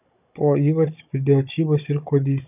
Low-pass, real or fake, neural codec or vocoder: 3.6 kHz; fake; codec, 16 kHz, 16 kbps, FunCodec, trained on Chinese and English, 50 frames a second